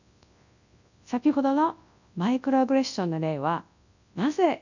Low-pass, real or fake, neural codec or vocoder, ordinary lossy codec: 7.2 kHz; fake; codec, 24 kHz, 0.9 kbps, WavTokenizer, large speech release; none